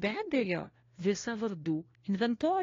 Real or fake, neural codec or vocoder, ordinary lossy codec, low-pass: fake; codec, 16 kHz, 1 kbps, FunCodec, trained on LibriTTS, 50 frames a second; AAC, 32 kbps; 7.2 kHz